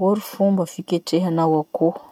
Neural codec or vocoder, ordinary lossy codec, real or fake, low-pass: none; none; real; 19.8 kHz